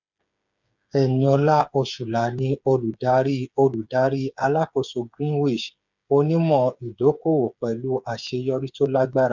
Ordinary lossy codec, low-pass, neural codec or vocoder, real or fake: none; 7.2 kHz; codec, 16 kHz, 8 kbps, FreqCodec, smaller model; fake